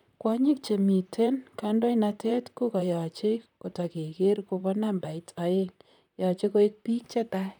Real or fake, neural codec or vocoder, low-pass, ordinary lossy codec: fake; vocoder, 44.1 kHz, 128 mel bands, Pupu-Vocoder; 19.8 kHz; none